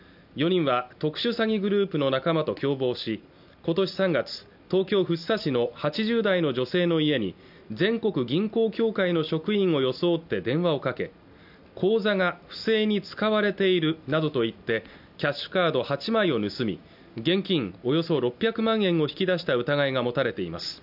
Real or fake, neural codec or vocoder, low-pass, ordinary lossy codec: real; none; 5.4 kHz; none